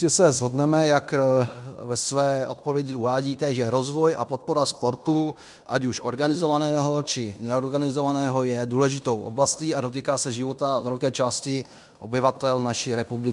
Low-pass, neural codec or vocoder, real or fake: 10.8 kHz; codec, 16 kHz in and 24 kHz out, 0.9 kbps, LongCat-Audio-Codec, fine tuned four codebook decoder; fake